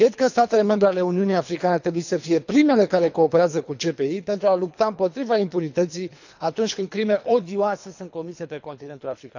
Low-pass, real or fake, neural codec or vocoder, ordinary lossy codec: 7.2 kHz; fake; codec, 24 kHz, 3 kbps, HILCodec; none